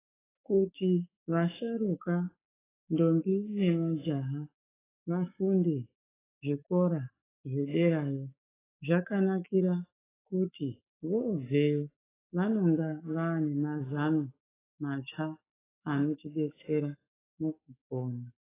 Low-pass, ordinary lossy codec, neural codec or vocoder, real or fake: 3.6 kHz; AAC, 16 kbps; autoencoder, 48 kHz, 128 numbers a frame, DAC-VAE, trained on Japanese speech; fake